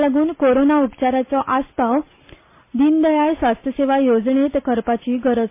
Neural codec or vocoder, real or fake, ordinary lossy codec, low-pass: none; real; MP3, 24 kbps; 3.6 kHz